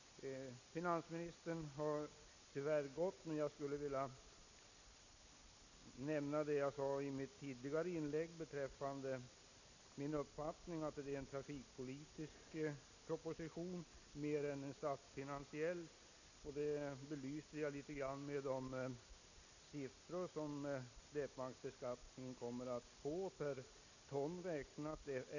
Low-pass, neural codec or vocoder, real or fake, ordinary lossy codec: 7.2 kHz; none; real; none